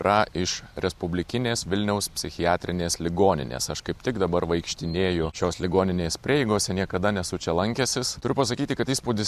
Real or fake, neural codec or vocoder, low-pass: real; none; 14.4 kHz